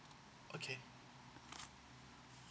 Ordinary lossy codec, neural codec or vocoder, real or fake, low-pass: none; none; real; none